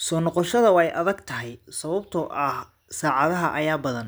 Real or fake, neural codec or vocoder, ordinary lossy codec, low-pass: real; none; none; none